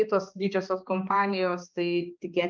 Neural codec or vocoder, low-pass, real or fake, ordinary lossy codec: codec, 16 kHz, 2 kbps, X-Codec, HuBERT features, trained on balanced general audio; 7.2 kHz; fake; Opus, 24 kbps